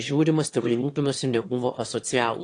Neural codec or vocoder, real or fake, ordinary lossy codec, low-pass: autoencoder, 22.05 kHz, a latent of 192 numbers a frame, VITS, trained on one speaker; fake; AAC, 48 kbps; 9.9 kHz